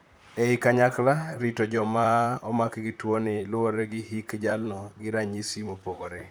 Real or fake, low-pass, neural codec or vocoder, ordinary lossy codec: fake; none; vocoder, 44.1 kHz, 128 mel bands, Pupu-Vocoder; none